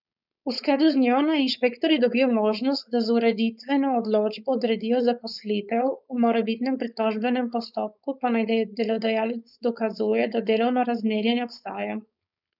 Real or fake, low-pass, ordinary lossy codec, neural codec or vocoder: fake; 5.4 kHz; none; codec, 16 kHz, 4.8 kbps, FACodec